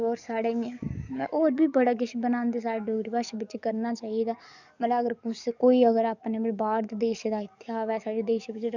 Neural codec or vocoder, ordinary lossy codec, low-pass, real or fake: codec, 44.1 kHz, 7.8 kbps, DAC; none; 7.2 kHz; fake